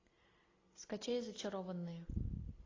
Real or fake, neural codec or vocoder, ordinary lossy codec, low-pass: real; none; AAC, 32 kbps; 7.2 kHz